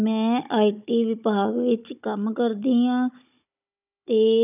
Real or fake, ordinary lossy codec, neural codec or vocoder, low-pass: fake; none; codec, 16 kHz, 16 kbps, FunCodec, trained on Chinese and English, 50 frames a second; 3.6 kHz